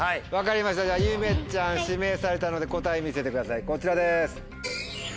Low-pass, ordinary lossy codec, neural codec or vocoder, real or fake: none; none; none; real